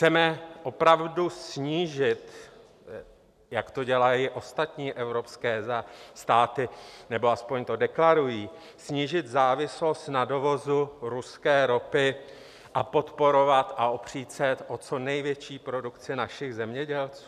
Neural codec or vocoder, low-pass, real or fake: none; 14.4 kHz; real